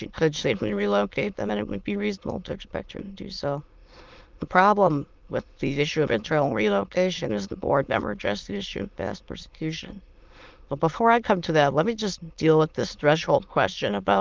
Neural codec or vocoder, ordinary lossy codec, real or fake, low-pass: autoencoder, 22.05 kHz, a latent of 192 numbers a frame, VITS, trained on many speakers; Opus, 32 kbps; fake; 7.2 kHz